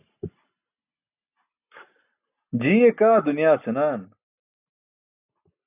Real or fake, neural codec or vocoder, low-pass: real; none; 3.6 kHz